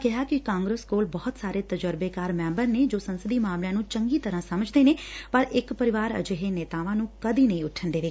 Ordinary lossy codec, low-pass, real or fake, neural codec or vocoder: none; none; real; none